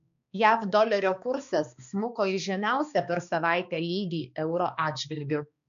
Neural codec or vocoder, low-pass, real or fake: codec, 16 kHz, 2 kbps, X-Codec, HuBERT features, trained on balanced general audio; 7.2 kHz; fake